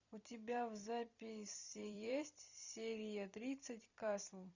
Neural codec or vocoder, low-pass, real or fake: vocoder, 44.1 kHz, 128 mel bands every 256 samples, BigVGAN v2; 7.2 kHz; fake